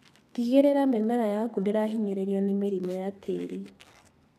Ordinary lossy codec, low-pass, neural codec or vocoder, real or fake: none; 14.4 kHz; codec, 32 kHz, 1.9 kbps, SNAC; fake